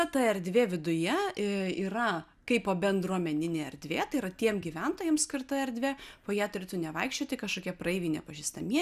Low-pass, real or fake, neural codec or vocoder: 14.4 kHz; real; none